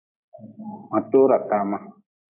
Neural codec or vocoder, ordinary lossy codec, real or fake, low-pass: none; MP3, 24 kbps; real; 3.6 kHz